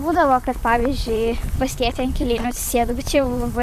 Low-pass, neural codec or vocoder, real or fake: 14.4 kHz; vocoder, 44.1 kHz, 128 mel bands, Pupu-Vocoder; fake